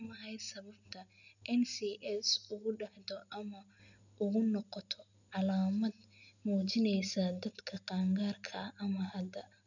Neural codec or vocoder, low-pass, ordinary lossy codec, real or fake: none; 7.2 kHz; none; real